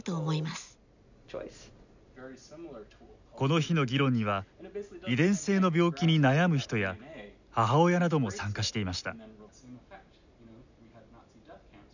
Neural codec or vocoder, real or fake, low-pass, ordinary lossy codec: none; real; 7.2 kHz; none